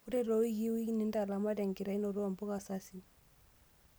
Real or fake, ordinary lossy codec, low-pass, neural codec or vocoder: real; none; none; none